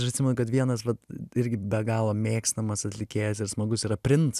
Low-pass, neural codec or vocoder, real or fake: 14.4 kHz; none; real